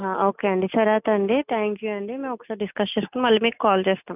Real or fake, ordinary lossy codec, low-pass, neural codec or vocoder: real; none; 3.6 kHz; none